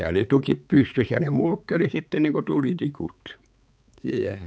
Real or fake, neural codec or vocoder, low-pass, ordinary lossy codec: fake; codec, 16 kHz, 4 kbps, X-Codec, HuBERT features, trained on balanced general audio; none; none